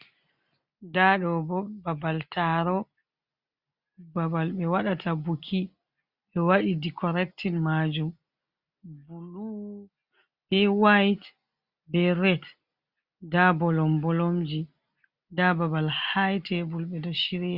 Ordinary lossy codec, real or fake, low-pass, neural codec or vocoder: Opus, 64 kbps; real; 5.4 kHz; none